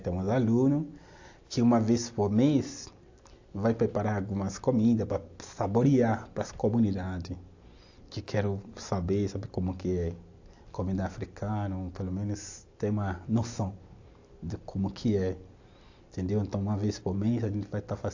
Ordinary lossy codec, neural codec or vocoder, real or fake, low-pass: AAC, 48 kbps; none; real; 7.2 kHz